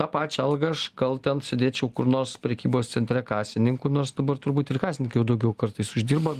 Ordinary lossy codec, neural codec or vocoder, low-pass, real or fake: Opus, 24 kbps; none; 14.4 kHz; real